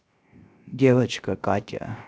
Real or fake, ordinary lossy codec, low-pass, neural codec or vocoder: fake; none; none; codec, 16 kHz, 0.3 kbps, FocalCodec